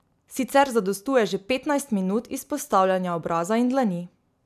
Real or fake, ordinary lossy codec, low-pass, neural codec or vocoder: real; none; 14.4 kHz; none